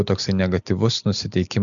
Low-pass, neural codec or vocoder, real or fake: 7.2 kHz; none; real